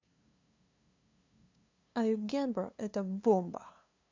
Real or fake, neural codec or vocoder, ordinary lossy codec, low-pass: fake; codec, 16 kHz, 2 kbps, FunCodec, trained on LibriTTS, 25 frames a second; MP3, 64 kbps; 7.2 kHz